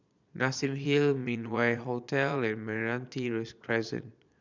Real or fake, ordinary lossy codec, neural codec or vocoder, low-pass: fake; none; vocoder, 22.05 kHz, 80 mel bands, Vocos; 7.2 kHz